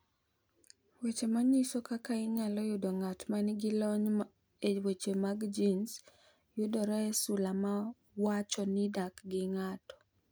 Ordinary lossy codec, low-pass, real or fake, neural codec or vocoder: none; none; real; none